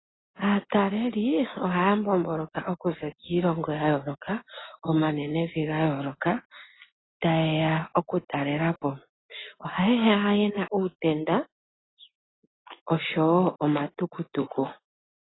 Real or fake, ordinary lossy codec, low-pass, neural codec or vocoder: real; AAC, 16 kbps; 7.2 kHz; none